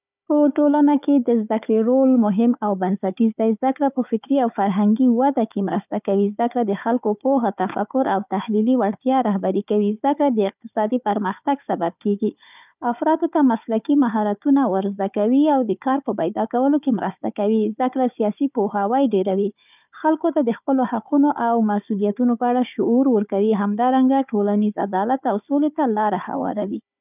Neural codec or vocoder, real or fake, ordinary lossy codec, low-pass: codec, 16 kHz, 4 kbps, FunCodec, trained on Chinese and English, 50 frames a second; fake; none; 3.6 kHz